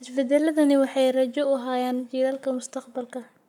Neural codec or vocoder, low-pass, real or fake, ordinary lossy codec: codec, 44.1 kHz, 7.8 kbps, Pupu-Codec; 19.8 kHz; fake; none